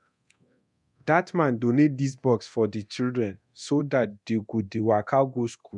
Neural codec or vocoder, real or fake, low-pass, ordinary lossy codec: codec, 24 kHz, 0.9 kbps, DualCodec; fake; 10.8 kHz; none